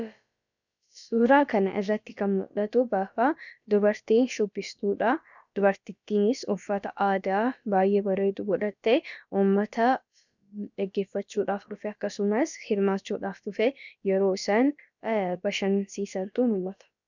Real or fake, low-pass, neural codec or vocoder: fake; 7.2 kHz; codec, 16 kHz, about 1 kbps, DyCAST, with the encoder's durations